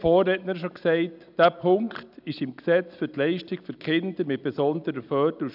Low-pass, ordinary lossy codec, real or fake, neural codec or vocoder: 5.4 kHz; none; real; none